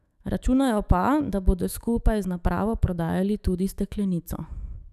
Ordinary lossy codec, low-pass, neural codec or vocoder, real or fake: none; 14.4 kHz; autoencoder, 48 kHz, 128 numbers a frame, DAC-VAE, trained on Japanese speech; fake